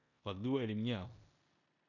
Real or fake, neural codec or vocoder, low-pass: fake; codec, 16 kHz in and 24 kHz out, 0.9 kbps, LongCat-Audio-Codec, four codebook decoder; 7.2 kHz